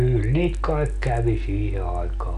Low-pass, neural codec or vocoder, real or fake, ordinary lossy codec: 10.8 kHz; none; real; none